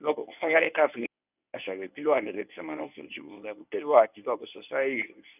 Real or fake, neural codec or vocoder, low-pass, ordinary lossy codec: fake; codec, 24 kHz, 0.9 kbps, WavTokenizer, medium speech release version 1; 3.6 kHz; none